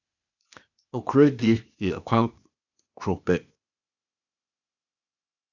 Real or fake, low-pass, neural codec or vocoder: fake; 7.2 kHz; codec, 16 kHz, 0.8 kbps, ZipCodec